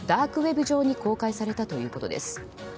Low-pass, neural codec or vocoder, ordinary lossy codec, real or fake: none; none; none; real